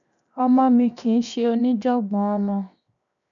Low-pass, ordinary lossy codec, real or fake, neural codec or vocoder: 7.2 kHz; none; fake; codec, 16 kHz, 0.7 kbps, FocalCodec